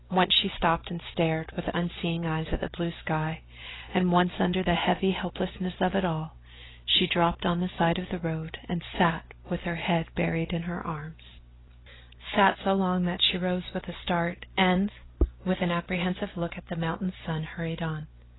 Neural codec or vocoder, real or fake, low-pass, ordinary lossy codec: none; real; 7.2 kHz; AAC, 16 kbps